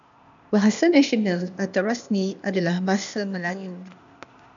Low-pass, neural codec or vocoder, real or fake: 7.2 kHz; codec, 16 kHz, 0.8 kbps, ZipCodec; fake